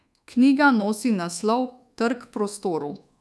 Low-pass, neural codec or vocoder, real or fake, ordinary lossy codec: none; codec, 24 kHz, 1.2 kbps, DualCodec; fake; none